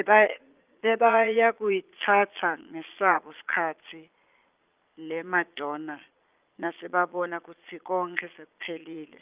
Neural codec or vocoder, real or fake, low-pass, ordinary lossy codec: vocoder, 22.05 kHz, 80 mel bands, Vocos; fake; 3.6 kHz; Opus, 24 kbps